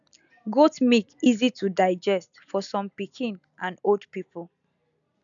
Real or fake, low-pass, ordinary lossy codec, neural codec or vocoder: real; 7.2 kHz; none; none